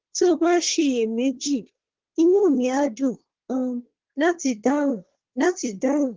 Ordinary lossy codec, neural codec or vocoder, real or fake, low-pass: Opus, 16 kbps; codec, 24 kHz, 1 kbps, SNAC; fake; 7.2 kHz